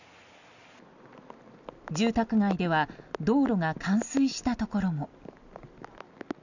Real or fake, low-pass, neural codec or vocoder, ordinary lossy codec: real; 7.2 kHz; none; none